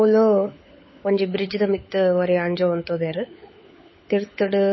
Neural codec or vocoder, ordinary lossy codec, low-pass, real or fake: codec, 16 kHz, 16 kbps, FunCodec, trained on LibriTTS, 50 frames a second; MP3, 24 kbps; 7.2 kHz; fake